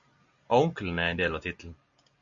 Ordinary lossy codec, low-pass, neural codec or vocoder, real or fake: MP3, 64 kbps; 7.2 kHz; none; real